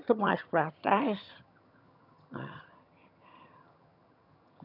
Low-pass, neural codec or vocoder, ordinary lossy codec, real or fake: 5.4 kHz; vocoder, 22.05 kHz, 80 mel bands, HiFi-GAN; none; fake